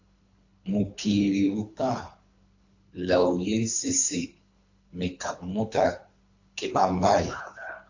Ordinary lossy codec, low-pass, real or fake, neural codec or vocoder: AAC, 48 kbps; 7.2 kHz; fake; codec, 24 kHz, 3 kbps, HILCodec